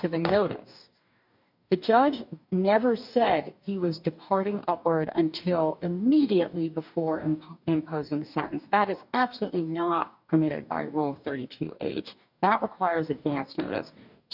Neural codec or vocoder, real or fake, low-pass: codec, 44.1 kHz, 2.6 kbps, DAC; fake; 5.4 kHz